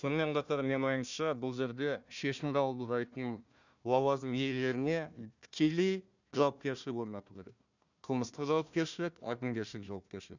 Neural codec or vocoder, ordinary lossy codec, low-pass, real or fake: codec, 16 kHz, 1 kbps, FunCodec, trained on Chinese and English, 50 frames a second; none; 7.2 kHz; fake